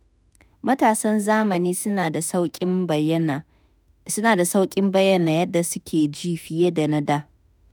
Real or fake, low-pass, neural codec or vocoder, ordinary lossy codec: fake; none; autoencoder, 48 kHz, 32 numbers a frame, DAC-VAE, trained on Japanese speech; none